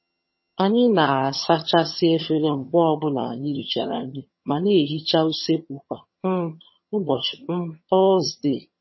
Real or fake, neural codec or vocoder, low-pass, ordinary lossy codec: fake; vocoder, 22.05 kHz, 80 mel bands, HiFi-GAN; 7.2 kHz; MP3, 24 kbps